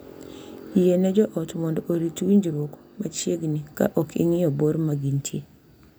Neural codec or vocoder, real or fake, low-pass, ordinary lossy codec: none; real; none; none